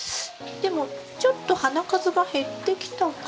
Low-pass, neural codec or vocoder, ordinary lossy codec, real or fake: none; none; none; real